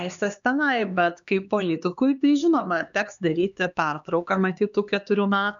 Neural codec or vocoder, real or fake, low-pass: codec, 16 kHz, 2 kbps, X-Codec, HuBERT features, trained on LibriSpeech; fake; 7.2 kHz